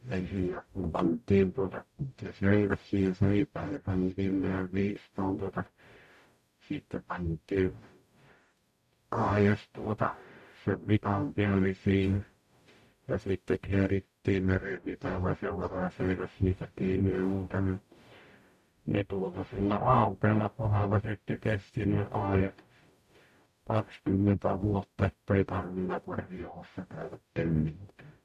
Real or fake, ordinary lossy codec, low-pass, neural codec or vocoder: fake; none; 14.4 kHz; codec, 44.1 kHz, 0.9 kbps, DAC